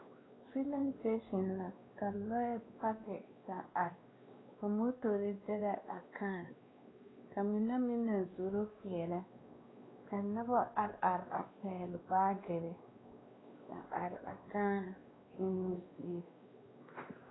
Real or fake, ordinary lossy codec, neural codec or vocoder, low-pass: fake; AAC, 16 kbps; codec, 16 kHz, 2 kbps, X-Codec, WavLM features, trained on Multilingual LibriSpeech; 7.2 kHz